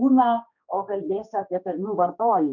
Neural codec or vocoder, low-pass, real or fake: codec, 16 kHz, 2 kbps, X-Codec, HuBERT features, trained on general audio; 7.2 kHz; fake